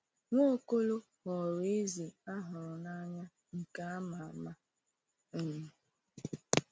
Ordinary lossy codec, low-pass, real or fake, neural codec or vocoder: none; none; real; none